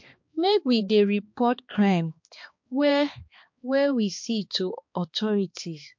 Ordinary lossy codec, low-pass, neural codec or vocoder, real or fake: MP3, 48 kbps; 7.2 kHz; codec, 16 kHz, 2 kbps, X-Codec, HuBERT features, trained on balanced general audio; fake